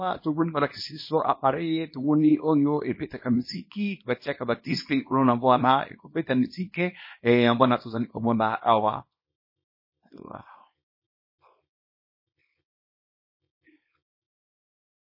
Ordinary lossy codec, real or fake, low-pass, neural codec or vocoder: MP3, 24 kbps; fake; 5.4 kHz; codec, 24 kHz, 0.9 kbps, WavTokenizer, small release